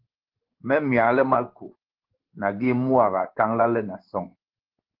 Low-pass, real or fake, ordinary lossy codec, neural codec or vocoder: 5.4 kHz; fake; Opus, 16 kbps; codec, 16 kHz in and 24 kHz out, 1 kbps, XY-Tokenizer